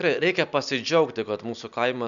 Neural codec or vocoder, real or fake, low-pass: none; real; 7.2 kHz